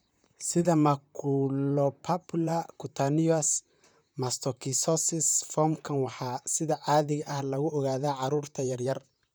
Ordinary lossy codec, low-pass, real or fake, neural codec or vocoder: none; none; fake; vocoder, 44.1 kHz, 128 mel bands, Pupu-Vocoder